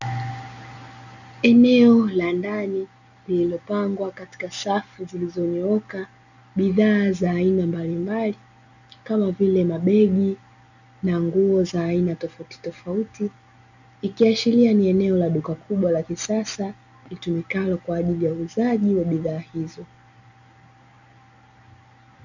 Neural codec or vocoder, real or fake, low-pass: none; real; 7.2 kHz